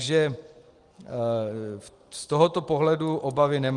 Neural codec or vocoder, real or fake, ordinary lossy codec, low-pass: none; real; Opus, 24 kbps; 10.8 kHz